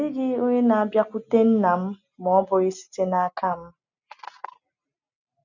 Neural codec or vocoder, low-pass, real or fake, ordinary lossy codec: none; 7.2 kHz; real; none